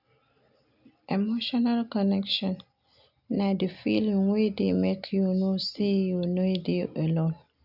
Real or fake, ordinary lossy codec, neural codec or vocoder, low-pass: real; none; none; 5.4 kHz